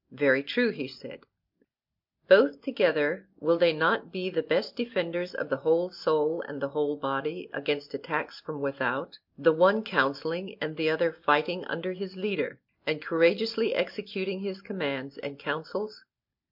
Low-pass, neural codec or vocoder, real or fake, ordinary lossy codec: 5.4 kHz; none; real; MP3, 48 kbps